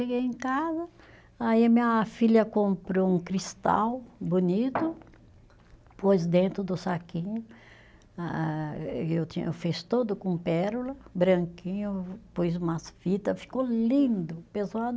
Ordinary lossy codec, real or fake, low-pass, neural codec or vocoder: none; real; none; none